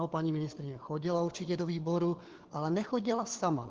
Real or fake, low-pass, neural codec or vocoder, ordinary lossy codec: fake; 7.2 kHz; codec, 16 kHz, 4 kbps, FunCodec, trained on LibriTTS, 50 frames a second; Opus, 16 kbps